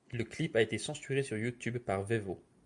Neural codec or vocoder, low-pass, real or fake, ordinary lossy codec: none; 10.8 kHz; real; MP3, 96 kbps